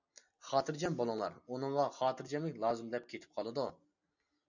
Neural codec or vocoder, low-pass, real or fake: none; 7.2 kHz; real